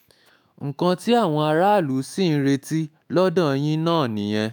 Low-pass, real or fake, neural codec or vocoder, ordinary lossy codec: none; fake; autoencoder, 48 kHz, 128 numbers a frame, DAC-VAE, trained on Japanese speech; none